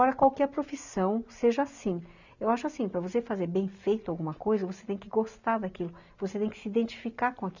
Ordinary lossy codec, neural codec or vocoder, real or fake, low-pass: none; none; real; 7.2 kHz